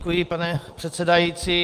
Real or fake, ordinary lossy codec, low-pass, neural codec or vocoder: real; Opus, 32 kbps; 14.4 kHz; none